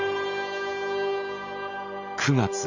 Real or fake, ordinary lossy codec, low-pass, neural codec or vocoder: real; AAC, 48 kbps; 7.2 kHz; none